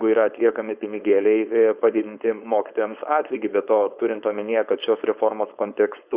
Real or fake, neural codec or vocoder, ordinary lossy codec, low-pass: fake; codec, 16 kHz, 4.8 kbps, FACodec; Opus, 64 kbps; 3.6 kHz